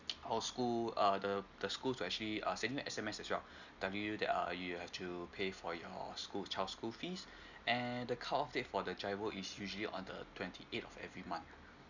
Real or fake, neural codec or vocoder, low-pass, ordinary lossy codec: real; none; 7.2 kHz; none